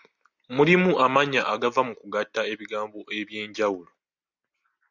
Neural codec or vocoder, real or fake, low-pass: none; real; 7.2 kHz